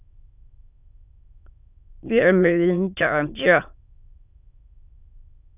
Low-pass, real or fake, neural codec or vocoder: 3.6 kHz; fake; autoencoder, 22.05 kHz, a latent of 192 numbers a frame, VITS, trained on many speakers